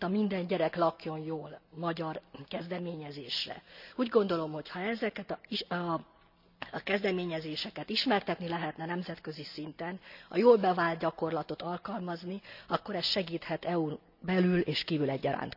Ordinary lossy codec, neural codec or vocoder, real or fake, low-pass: none; none; real; 5.4 kHz